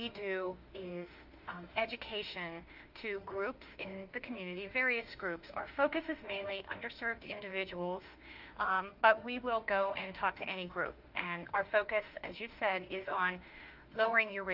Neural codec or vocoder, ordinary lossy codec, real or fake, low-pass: autoencoder, 48 kHz, 32 numbers a frame, DAC-VAE, trained on Japanese speech; Opus, 32 kbps; fake; 5.4 kHz